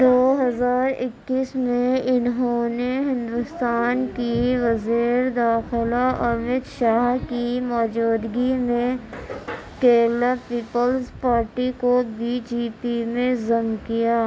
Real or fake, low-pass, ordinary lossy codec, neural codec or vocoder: real; none; none; none